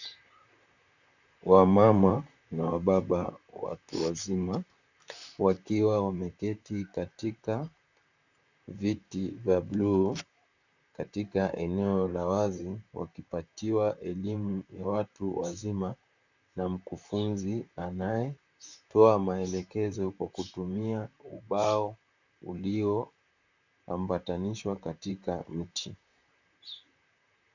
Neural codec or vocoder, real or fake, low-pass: vocoder, 44.1 kHz, 128 mel bands, Pupu-Vocoder; fake; 7.2 kHz